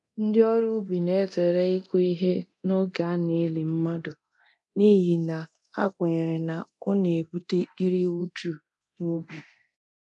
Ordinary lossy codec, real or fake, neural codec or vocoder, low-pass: none; fake; codec, 24 kHz, 0.9 kbps, DualCodec; 10.8 kHz